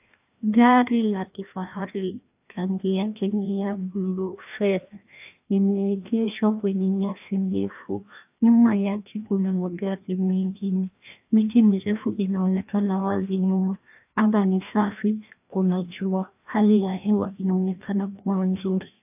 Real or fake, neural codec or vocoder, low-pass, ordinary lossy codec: fake; codec, 16 kHz, 1 kbps, FreqCodec, larger model; 3.6 kHz; AAC, 32 kbps